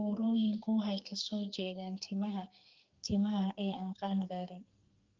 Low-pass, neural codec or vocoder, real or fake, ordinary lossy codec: 7.2 kHz; codec, 44.1 kHz, 2.6 kbps, SNAC; fake; Opus, 32 kbps